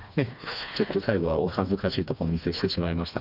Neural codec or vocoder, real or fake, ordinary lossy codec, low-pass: codec, 16 kHz, 2 kbps, FreqCodec, smaller model; fake; none; 5.4 kHz